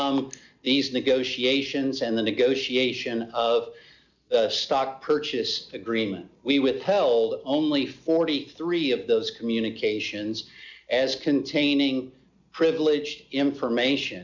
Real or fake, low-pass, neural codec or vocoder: real; 7.2 kHz; none